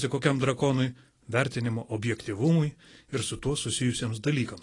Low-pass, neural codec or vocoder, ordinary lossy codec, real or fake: 10.8 kHz; none; AAC, 32 kbps; real